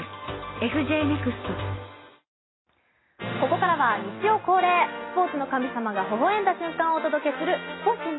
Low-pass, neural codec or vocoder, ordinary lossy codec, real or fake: 7.2 kHz; none; AAC, 16 kbps; real